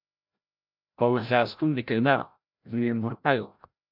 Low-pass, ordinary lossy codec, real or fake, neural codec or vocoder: 5.4 kHz; MP3, 48 kbps; fake; codec, 16 kHz, 0.5 kbps, FreqCodec, larger model